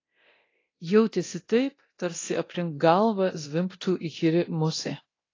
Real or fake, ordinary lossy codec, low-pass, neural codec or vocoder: fake; AAC, 32 kbps; 7.2 kHz; codec, 24 kHz, 0.9 kbps, DualCodec